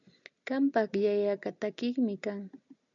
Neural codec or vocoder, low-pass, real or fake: none; 7.2 kHz; real